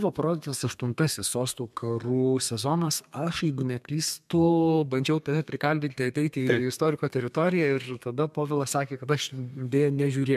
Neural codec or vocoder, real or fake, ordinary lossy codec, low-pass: codec, 32 kHz, 1.9 kbps, SNAC; fake; MP3, 96 kbps; 14.4 kHz